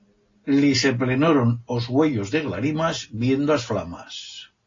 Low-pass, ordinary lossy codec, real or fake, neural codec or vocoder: 7.2 kHz; AAC, 32 kbps; real; none